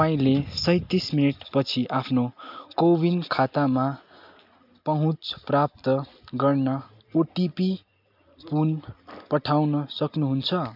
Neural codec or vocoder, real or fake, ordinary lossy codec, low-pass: none; real; MP3, 48 kbps; 5.4 kHz